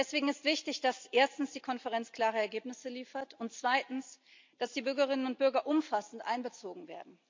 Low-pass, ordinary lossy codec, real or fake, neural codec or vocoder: 7.2 kHz; MP3, 64 kbps; real; none